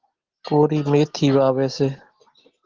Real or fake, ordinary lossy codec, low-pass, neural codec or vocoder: real; Opus, 16 kbps; 7.2 kHz; none